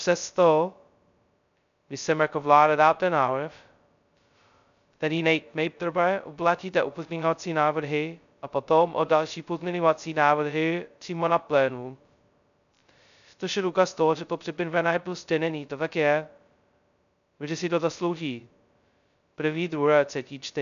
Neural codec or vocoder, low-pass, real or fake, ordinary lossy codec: codec, 16 kHz, 0.2 kbps, FocalCodec; 7.2 kHz; fake; MP3, 96 kbps